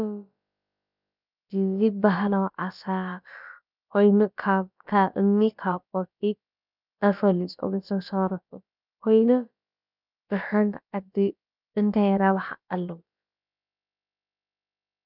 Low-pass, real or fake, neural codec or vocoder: 5.4 kHz; fake; codec, 16 kHz, about 1 kbps, DyCAST, with the encoder's durations